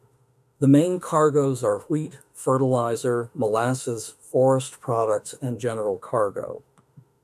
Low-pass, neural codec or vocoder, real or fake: 14.4 kHz; autoencoder, 48 kHz, 32 numbers a frame, DAC-VAE, trained on Japanese speech; fake